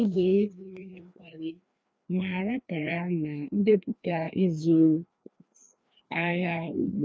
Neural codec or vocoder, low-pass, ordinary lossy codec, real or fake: codec, 16 kHz, 2 kbps, FreqCodec, larger model; none; none; fake